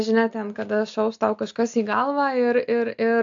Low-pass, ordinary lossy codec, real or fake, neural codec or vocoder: 7.2 kHz; AAC, 64 kbps; real; none